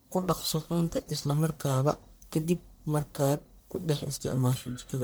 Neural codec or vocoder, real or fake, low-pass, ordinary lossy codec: codec, 44.1 kHz, 1.7 kbps, Pupu-Codec; fake; none; none